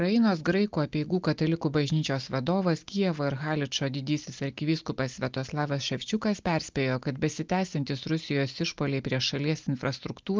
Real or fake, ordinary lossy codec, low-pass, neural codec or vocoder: real; Opus, 24 kbps; 7.2 kHz; none